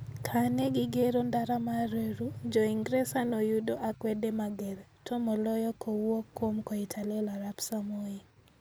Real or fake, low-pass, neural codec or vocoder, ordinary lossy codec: real; none; none; none